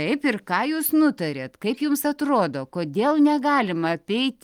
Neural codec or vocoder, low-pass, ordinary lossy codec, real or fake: none; 19.8 kHz; Opus, 32 kbps; real